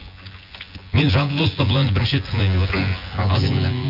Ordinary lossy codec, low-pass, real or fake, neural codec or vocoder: none; 5.4 kHz; fake; vocoder, 24 kHz, 100 mel bands, Vocos